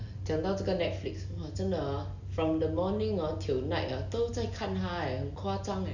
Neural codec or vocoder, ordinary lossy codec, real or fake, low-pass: none; none; real; 7.2 kHz